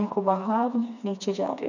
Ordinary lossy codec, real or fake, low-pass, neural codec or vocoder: none; fake; 7.2 kHz; codec, 16 kHz, 2 kbps, FreqCodec, smaller model